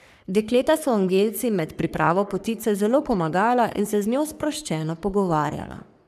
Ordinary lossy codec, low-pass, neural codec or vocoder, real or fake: none; 14.4 kHz; codec, 44.1 kHz, 3.4 kbps, Pupu-Codec; fake